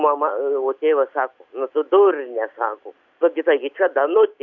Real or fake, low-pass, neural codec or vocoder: real; 7.2 kHz; none